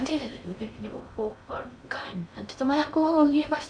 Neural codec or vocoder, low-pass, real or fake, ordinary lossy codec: codec, 16 kHz in and 24 kHz out, 0.6 kbps, FocalCodec, streaming, 4096 codes; 9.9 kHz; fake; none